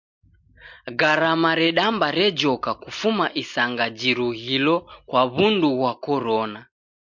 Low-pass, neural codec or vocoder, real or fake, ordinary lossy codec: 7.2 kHz; none; real; MP3, 64 kbps